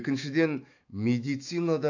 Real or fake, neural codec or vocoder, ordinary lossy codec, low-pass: fake; autoencoder, 48 kHz, 128 numbers a frame, DAC-VAE, trained on Japanese speech; AAC, 48 kbps; 7.2 kHz